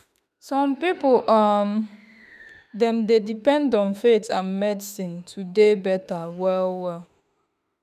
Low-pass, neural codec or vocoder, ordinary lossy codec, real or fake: 14.4 kHz; autoencoder, 48 kHz, 32 numbers a frame, DAC-VAE, trained on Japanese speech; none; fake